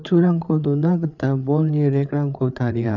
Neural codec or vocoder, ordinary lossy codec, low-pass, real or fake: codec, 16 kHz, 8 kbps, FreqCodec, larger model; none; 7.2 kHz; fake